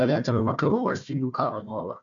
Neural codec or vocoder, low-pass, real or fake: codec, 16 kHz, 1 kbps, FunCodec, trained on Chinese and English, 50 frames a second; 7.2 kHz; fake